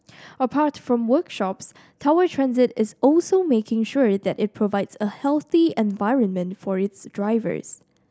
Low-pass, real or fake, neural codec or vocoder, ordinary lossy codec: none; real; none; none